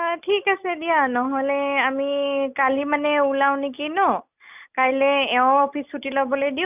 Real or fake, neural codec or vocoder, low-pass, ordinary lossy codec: real; none; 3.6 kHz; none